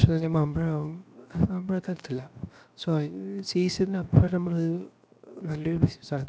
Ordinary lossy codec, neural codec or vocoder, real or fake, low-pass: none; codec, 16 kHz, 0.7 kbps, FocalCodec; fake; none